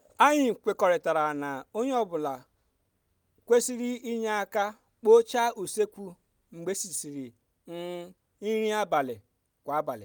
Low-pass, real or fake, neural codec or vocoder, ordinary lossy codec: none; real; none; none